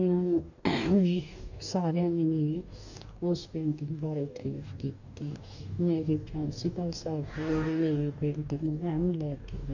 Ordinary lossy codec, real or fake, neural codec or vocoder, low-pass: none; fake; codec, 44.1 kHz, 2.6 kbps, DAC; 7.2 kHz